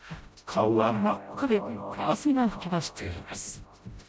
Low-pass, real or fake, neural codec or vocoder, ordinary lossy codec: none; fake; codec, 16 kHz, 0.5 kbps, FreqCodec, smaller model; none